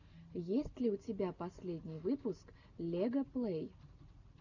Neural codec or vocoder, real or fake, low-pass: none; real; 7.2 kHz